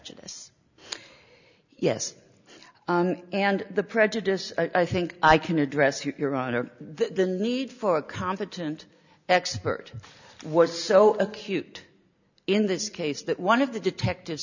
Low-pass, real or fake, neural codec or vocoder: 7.2 kHz; real; none